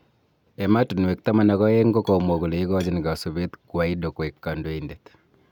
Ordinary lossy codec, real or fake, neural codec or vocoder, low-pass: none; real; none; 19.8 kHz